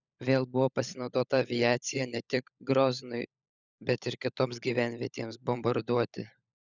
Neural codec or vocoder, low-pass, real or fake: codec, 16 kHz, 16 kbps, FunCodec, trained on LibriTTS, 50 frames a second; 7.2 kHz; fake